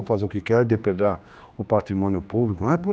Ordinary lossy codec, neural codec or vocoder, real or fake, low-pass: none; codec, 16 kHz, 2 kbps, X-Codec, HuBERT features, trained on general audio; fake; none